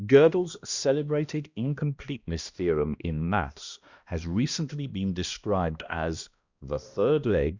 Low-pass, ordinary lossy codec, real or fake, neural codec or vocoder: 7.2 kHz; Opus, 64 kbps; fake; codec, 16 kHz, 1 kbps, X-Codec, HuBERT features, trained on balanced general audio